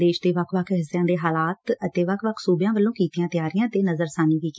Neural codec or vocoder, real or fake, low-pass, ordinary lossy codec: none; real; none; none